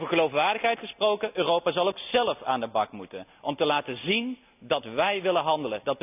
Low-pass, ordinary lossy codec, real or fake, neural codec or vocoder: 3.6 kHz; none; real; none